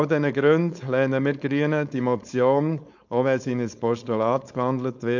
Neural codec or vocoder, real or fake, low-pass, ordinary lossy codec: codec, 16 kHz, 4.8 kbps, FACodec; fake; 7.2 kHz; none